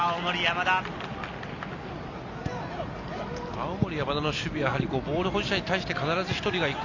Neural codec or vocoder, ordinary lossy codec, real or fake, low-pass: none; none; real; 7.2 kHz